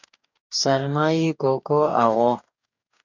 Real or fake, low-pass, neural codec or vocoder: fake; 7.2 kHz; codec, 44.1 kHz, 2.6 kbps, DAC